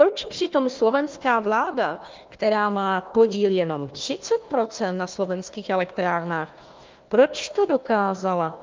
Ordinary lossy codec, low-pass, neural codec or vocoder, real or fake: Opus, 24 kbps; 7.2 kHz; codec, 16 kHz, 1 kbps, FunCodec, trained on Chinese and English, 50 frames a second; fake